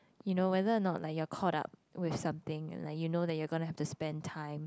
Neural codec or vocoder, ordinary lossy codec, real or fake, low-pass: none; none; real; none